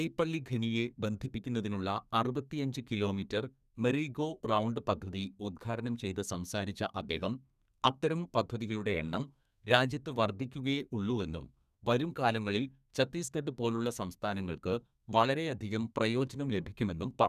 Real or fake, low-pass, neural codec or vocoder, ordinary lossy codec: fake; 14.4 kHz; codec, 32 kHz, 1.9 kbps, SNAC; none